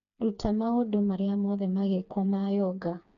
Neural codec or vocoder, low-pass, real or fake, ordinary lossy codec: codec, 16 kHz, 4 kbps, FreqCodec, smaller model; 7.2 kHz; fake; none